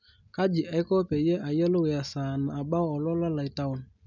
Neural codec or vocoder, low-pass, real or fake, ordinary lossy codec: none; 7.2 kHz; real; none